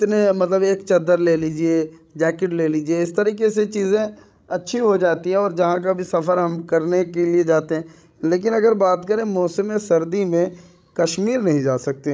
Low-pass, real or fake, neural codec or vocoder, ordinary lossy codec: none; fake; codec, 16 kHz, 16 kbps, FreqCodec, larger model; none